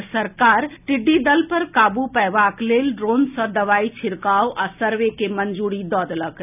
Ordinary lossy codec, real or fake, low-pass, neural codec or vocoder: none; real; 3.6 kHz; none